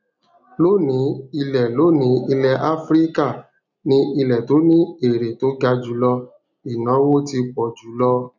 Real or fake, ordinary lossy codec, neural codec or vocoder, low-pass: real; none; none; 7.2 kHz